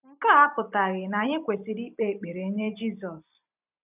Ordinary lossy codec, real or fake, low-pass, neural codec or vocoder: none; fake; 3.6 kHz; vocoder, 44.1 kHz, 128 mel bands every 256 samples, BigVGAN v2